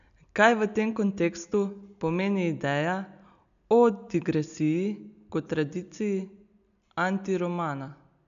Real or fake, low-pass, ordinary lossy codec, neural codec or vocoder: real; 7.2 kHz; none; none